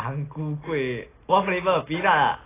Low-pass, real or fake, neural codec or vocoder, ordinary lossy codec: 3.6 kHz; real; none; AAC, 16 kbps